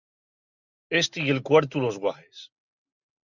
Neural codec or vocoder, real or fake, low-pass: none; real; 7.2 kHz